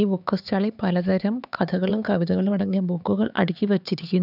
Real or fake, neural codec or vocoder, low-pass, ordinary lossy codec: fake; codec, 16 kHz, 4 kbps, X-Codec, HuBERT features, trained on LibriSpeech; 5.4 kHz; none